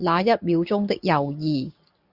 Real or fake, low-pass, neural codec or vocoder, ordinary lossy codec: real; 5.4 kHz; none; Opus, 64 kbps